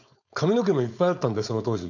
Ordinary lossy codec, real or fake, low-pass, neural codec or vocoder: none; fake; 7.2 kHz; codec, 16 kHz, 4.8 kbps, FACodec